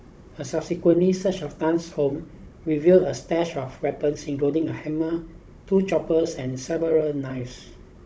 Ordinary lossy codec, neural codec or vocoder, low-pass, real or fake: none; codec, 16 kHz, 16 kbps, FunCodec, trained on Chinese and English, 50 frames a second; none; fake